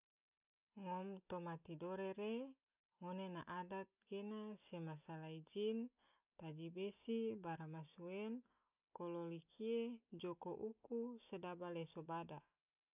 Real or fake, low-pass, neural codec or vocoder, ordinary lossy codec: real; 3.6 kHz; none; none